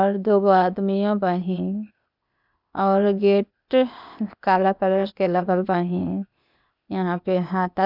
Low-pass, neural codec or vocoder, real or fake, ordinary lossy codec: 5.4 kHz; codec, 16 kHz, 0.8 kbps, ZipCodec; fake; none